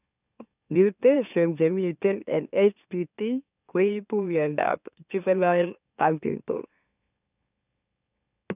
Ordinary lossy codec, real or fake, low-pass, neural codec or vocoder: none; fake; 3.6 kHz; autoencoder, 44.1 kHz, a latent of 192 numbers a frame, MeloTTS